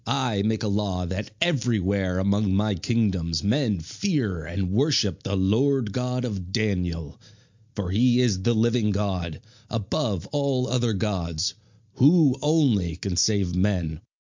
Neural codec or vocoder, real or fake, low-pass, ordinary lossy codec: none; real; 7.2 kHz; MP3, 64 kbps